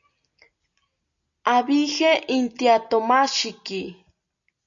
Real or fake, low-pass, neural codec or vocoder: real; 7.2 kHz; none